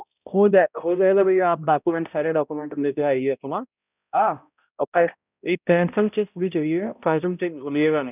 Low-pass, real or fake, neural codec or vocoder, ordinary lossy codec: 3.6 kHz; fake; codec, 16 kHz, 0.5 kbps, X-Codec, HuBERT features, trained on balanced general audio; none